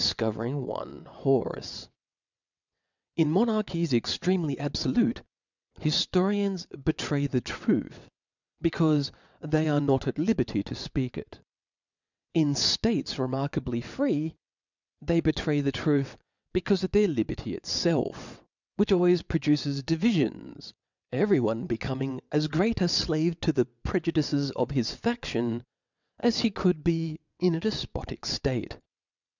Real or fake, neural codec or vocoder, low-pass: fake; vocoder, 22.05 kHz, 80 mel bands, WaveNeXt; 7.2 kHz